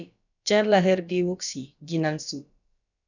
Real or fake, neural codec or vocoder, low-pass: fake; codec, 16 kHz, about 1 kbps, DyCAST, with the encoder's durations; 7.2 kHz